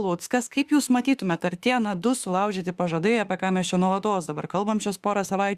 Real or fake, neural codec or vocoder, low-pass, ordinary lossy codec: fake; autoencoder, 48 kHz, 32 numbers a frame, DAC-VAE, trained on Japanese speech; 14.4 kHz; Opus, 64 kbps